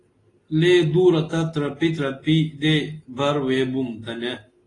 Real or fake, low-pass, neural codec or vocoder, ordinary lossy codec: real; 10.8 kHz; none; AAC, 32 kbps